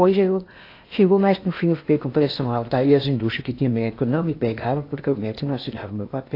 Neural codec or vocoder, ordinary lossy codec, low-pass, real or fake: codec, 16 kHz in and 24 kHz out, 0.8 kbps, FocalCodec, streaming, 65536 codes; AAC, 32 kbps; 5.4 kHz; fake